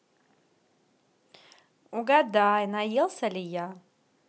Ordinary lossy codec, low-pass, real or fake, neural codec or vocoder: none; none; real; none